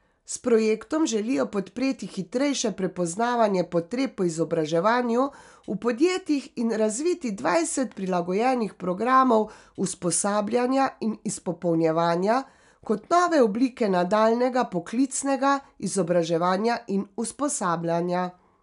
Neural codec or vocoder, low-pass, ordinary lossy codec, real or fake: none; 10.8 kHz; none; real